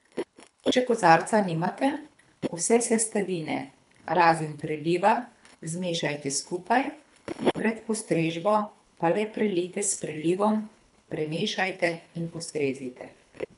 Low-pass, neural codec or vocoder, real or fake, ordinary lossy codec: 10.8 kHz; codec, 24 kHz, 3 kbps, HILCodec; fake; none